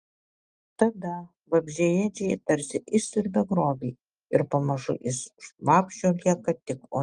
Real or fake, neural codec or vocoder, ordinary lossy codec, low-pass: real; none; Opus, 32 kbps; 10.8 kHz